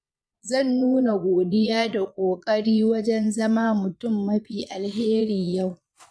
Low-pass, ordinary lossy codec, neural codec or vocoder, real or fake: none; none; vocoder, 22.05 kHz, 80 mel bands, Vocos; fake